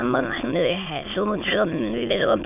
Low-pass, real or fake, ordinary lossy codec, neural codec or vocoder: 3.6 kHz; fake; none; autoencoder, 22.05 kHz, a latent of 192 numbers a frame, VITS, trained on many speakers